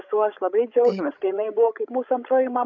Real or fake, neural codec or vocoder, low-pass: fake; codec, 16 kHz, 16 kbps, FreqCodec, larger model; 7.2 kHz